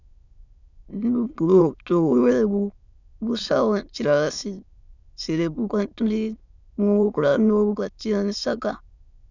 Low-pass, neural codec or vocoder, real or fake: 7.2 kHz; autoencoder, 22.05 kHz, a latent of 192 numbers a frame, VITS, trained on many speakers; fake